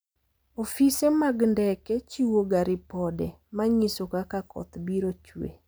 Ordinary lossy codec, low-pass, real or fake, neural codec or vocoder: none; none; real; none